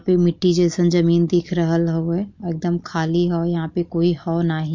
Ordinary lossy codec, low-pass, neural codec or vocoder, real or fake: MP3, 48 kbps; 7.2 kHz; none; real